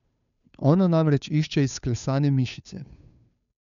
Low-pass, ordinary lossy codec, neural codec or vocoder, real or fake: 7.2 kHz; none; codec, 16 kHz, 2 kbps, FunCodec, trained on Chinese and English, 25 frames a second; fake